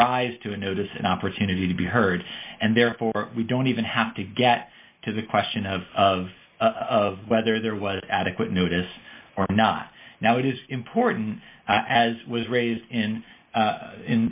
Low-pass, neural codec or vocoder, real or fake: 3.6 kHz; none; real